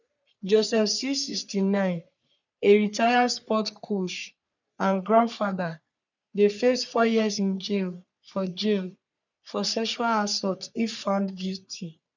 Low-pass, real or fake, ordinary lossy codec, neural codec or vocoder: 7.2 kHz; fake; none; codec, 44.1 kHz, 3.4 kbps, Pupu-Codec